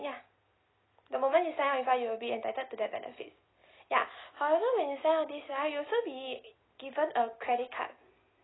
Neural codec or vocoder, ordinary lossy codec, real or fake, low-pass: none; AAC, 16 kbps; real; 7.2 kHz